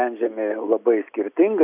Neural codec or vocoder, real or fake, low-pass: none; real; 3.6 kHz